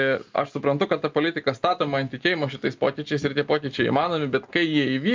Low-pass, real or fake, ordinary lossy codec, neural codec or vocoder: 7.2 kHz; real; Opus, 24 kbps; none